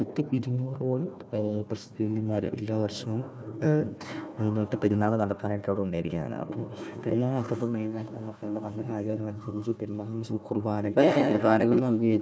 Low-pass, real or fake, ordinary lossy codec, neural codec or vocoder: none; fake; none; codec, 16 kHz, 1 kbps, FunCodec, trained on Chinese and English, 50 frames a second